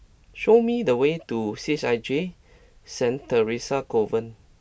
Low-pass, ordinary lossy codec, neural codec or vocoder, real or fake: none; none; none; real